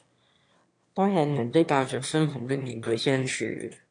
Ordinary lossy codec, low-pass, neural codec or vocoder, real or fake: AAC, 64 kbps; 9.9 kHz; autoencoder, 22.05 kHz, a latent of 192 numbers a frame, VITS, trained on one speaker; fake